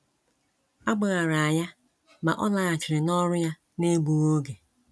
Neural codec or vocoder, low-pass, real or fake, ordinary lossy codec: none; none; real; none